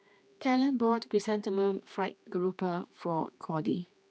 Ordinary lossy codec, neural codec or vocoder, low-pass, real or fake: none; codec, 16 kHz, 2 kbps, X-Codec, HuBERT features, trained on general audio; none; fake